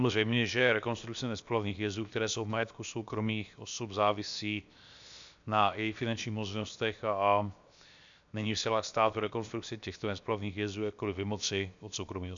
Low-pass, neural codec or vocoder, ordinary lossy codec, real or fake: 7.2 kHz; codec, 16 kHz, 0.7 kbps, FocalCodec; MP3, 64 kbps; fake